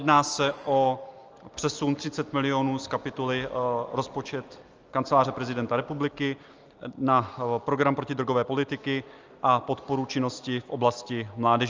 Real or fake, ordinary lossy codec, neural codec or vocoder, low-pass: real; Opus, 24 kbps; none; 7.2 kHz